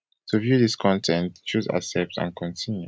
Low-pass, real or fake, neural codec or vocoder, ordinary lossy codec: none; real; none; none